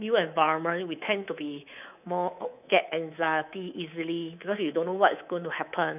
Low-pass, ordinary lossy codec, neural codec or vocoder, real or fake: 3.6 kHz; none; codec, 24 kHz, 3.1 kbps, DualCodec; fake